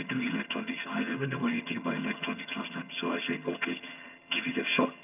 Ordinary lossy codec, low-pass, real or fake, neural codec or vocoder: none; 3.6 kHz; fake; vocoder, 22.05 kHz, 80 mel bands, HiFi-GAN